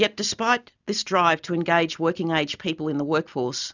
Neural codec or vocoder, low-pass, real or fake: none; 7.2 kHz; real